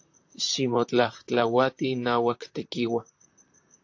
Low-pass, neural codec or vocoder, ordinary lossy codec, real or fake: 7.2 kHz; vocoder, 44.1 kHz, 128 mel bands, Pupu-Vocoder; AAC, 48 kbps; fake